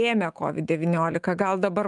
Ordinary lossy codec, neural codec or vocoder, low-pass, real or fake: Opus, 32 kbps; autoencoder, 48 kHz, 128 numbers a frame, DAC-VAE, trained on Japanese speech; 10.8 kHz; fake